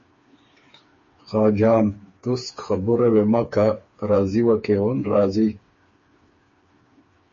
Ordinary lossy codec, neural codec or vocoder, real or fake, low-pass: MP3, 32 kbps; codec, 16 kHz, 4 kbps, FreqCodec, smaller model; fake; 7.2 kHz